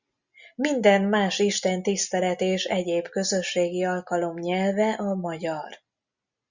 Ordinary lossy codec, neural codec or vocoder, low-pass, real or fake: Opus, 64 kbps; none; 7.2 kHz; real